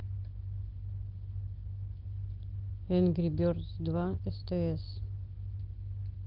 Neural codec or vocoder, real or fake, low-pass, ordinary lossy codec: none; real; 5.4 kHz; Opus, 16 kbps